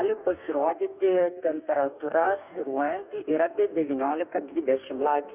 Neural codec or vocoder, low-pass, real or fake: codec, 44.1 kHz, 2.6 kbps, DAC; 3.6 kHz; fake